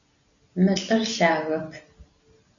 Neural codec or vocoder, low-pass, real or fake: none; 7.2 kHz; real